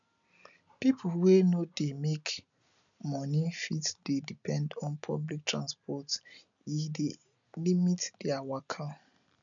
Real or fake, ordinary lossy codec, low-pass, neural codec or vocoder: real; none; 7.2 kHz; none